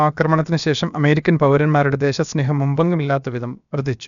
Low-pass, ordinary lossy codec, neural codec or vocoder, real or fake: 7.2 kHz; none; codec, 16 kHz, about 1 kbps, DyCAST, with the encoder's durations; fake